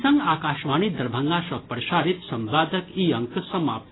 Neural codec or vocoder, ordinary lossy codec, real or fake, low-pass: none; AAC, 16 kbps; real; 7.2 kHz